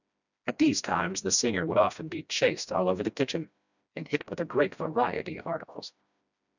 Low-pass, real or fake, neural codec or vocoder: 7.2 kHz; fake; codec, 16 kHz, 1 kbps, FreqCodec, smaller model